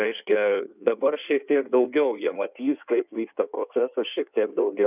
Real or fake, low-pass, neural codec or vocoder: fake; 3.6 kHz; codec, 16 kHz in and 24 kHz out, 1.1 kbps, FireRedTTS-2 codec